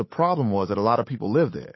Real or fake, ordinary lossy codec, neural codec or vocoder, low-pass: fake; MP3, 24 kbps; codec, 16 kHz, 8 kbps, FunCodec, trained on Chinese and English, 25 frames a second; 7.2 kHz